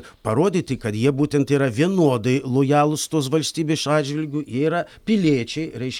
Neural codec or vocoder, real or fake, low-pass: none; real; 19.8 kHz